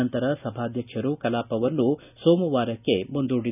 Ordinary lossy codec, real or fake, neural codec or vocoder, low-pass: none; real; none; 3.6 kHz